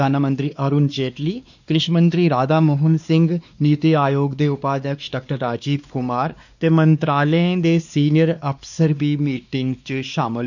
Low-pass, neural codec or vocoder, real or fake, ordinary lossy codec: 7.2 kHz; codec, 16 kHz, 2 kbps, X-Codec, WavLM features, trained on Multilingual LibriSpeech; fake; none